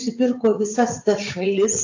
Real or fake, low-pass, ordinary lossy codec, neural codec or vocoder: fake; 7.2 kHz; AAC, 48 kbps; vocoder, 44.1 kHz, 80 mel bands, Vocos